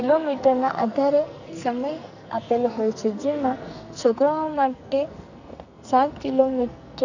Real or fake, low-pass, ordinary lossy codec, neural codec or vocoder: fake; 7.2 kHz; none; codec, 44.1 kHz, 2.6 kbps, SNAC